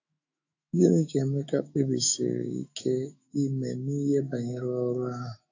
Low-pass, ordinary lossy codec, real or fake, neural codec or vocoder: 7.2 kHz; AAC, 48 kbps; fake; autoencoder, 48 kHz, 128 numbers a frame, DAC-VAE, trained on Japanese speech